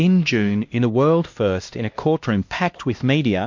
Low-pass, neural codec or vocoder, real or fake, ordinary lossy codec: 7.2 kHz; codec, 16 kHz, 1 kbps, X-Codec, HuBERT features, trained on LibriSpeech; fake; MP3, 48 kbps